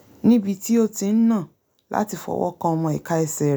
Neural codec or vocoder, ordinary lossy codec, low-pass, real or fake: none; none; none; real